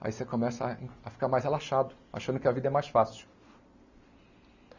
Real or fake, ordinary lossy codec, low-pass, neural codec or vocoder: real; none; 7.2 kHz; none